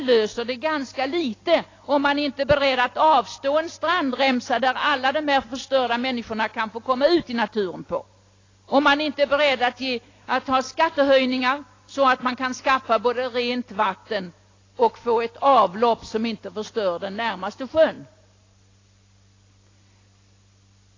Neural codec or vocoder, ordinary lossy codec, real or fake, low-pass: none; AAC, 32 kbps; real; 7.2 kHz